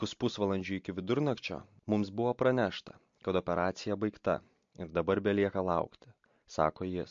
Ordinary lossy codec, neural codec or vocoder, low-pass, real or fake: MP3, 48 kbps; none; 7.2 kHz; real